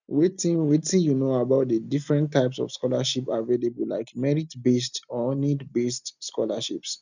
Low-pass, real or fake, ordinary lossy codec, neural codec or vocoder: 7.2 kHz; real; none; none